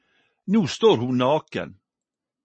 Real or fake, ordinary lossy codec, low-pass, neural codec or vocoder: real; MP3, 32 kbps; 9.9 kHz; none